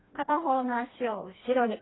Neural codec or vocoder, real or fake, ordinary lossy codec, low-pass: codec, 16 kHz, 2 kbps, FreqCodec, smaller model; fake; AAC, 16 kbps; 7.2 kHz